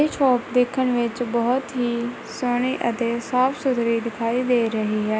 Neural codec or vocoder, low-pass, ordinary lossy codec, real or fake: none; none; none; real